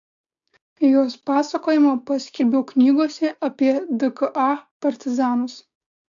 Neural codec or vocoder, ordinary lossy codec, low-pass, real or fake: codec, 16 kHz, 6 kbps, DAC; AAC, 64 kbps; 7.2 kHz; fake